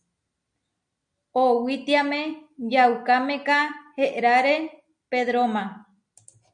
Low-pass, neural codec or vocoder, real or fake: 9.9 kHz; none; real